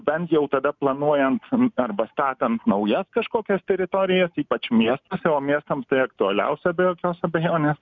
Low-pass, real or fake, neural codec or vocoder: 7.2 kHz; real; none